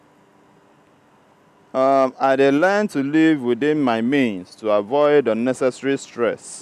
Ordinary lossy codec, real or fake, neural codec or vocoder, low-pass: none; real; none; 14.4 kHz